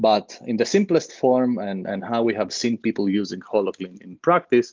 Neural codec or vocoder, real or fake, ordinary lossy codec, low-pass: none; real; Opus, 24 kbps; 7.2 kHz